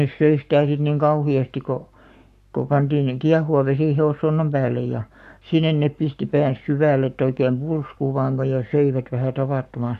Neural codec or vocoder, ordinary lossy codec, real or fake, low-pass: codec, 44.1 kHz, 7.8 kbps, Pupu-Codec; none; fake; 14.4 kHz